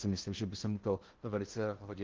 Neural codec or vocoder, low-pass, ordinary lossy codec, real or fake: codec, 16 kHz in and 24 kHz out, 0.6 kbps, FocalCodec, streaming, 4096 codes; 7.2 kHz; Opus, 16 kbps; fake